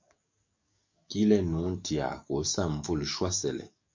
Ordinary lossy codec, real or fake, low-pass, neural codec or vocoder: MP3, 48 kbps; fake; 7.2 kHz; codec, 44.1 kHz, 7.8 kbps, DAC